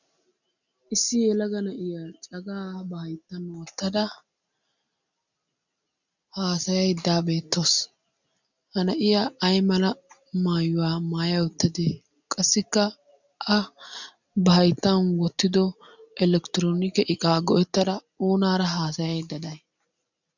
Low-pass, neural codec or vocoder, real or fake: 7.2 kHz; none; real